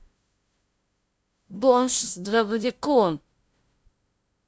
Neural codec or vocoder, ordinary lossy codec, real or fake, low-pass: codec, 16 kHz, 0.5 kbps, FunCodec, trained on LibriTTS, 25 frames a second; none; fake; none